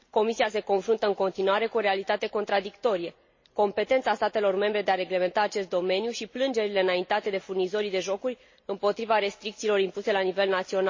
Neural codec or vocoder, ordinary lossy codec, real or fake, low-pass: none; MP3, 32 kbps; real; 7.2 kHz